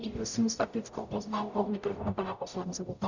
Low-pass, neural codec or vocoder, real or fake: 7.2 kHz; codec, 44.1 kHz, 0.9 kbps, DAC; fake